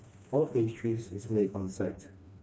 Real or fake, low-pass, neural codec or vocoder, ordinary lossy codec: fake; none; codec, 16 kHz, 2 kbps, FreqCodec, smaller model; none